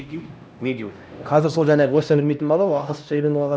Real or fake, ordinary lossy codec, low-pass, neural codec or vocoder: fake; none; none; codec, 16 kHz, 1 kbps, X-Codec, HuBERT features, trained on LibriSpeech